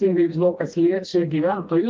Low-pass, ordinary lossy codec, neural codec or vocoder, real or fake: 7.2 kHz; Opus, 32 kbps; codec, 16 kHz, 1 kbps, FreqCodec, smaller model; fake